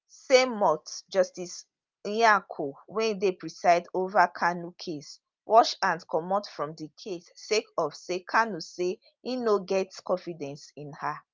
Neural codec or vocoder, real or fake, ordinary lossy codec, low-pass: none; real; Opus, 32 kbps; 7.2 kHz